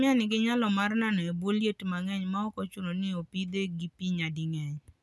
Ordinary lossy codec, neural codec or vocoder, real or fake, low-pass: none; none; real; none